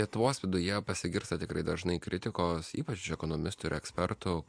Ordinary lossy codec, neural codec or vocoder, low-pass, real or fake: MP3, 64 kbps; none; 9.9 kHz; real